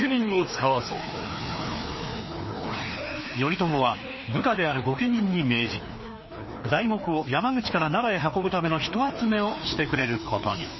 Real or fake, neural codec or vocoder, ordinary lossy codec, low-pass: fake; codec, 16 kHz, 2 kbps, FreqCodec, larger model; MP3, 24 kbps; 7.2 kHz